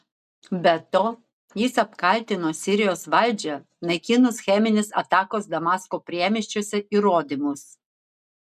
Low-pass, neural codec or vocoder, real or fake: 14.4 kHz; none; real